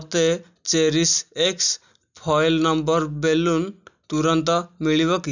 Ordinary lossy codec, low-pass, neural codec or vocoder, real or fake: none; 7.2 kHz; none; real